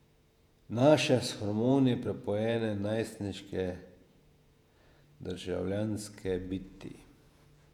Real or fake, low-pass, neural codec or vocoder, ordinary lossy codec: fake; 19.8 kHz; vocoder, 48 kHz, 128 mel bands, Vocos; none